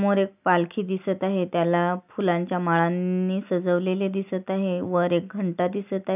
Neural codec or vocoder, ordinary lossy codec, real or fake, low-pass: none; none; real; 3.6 kHz